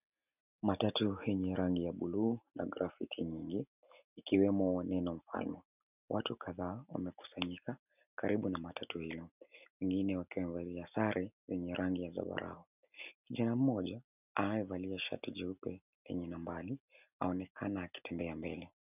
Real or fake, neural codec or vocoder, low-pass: real; none; 3.6 kHz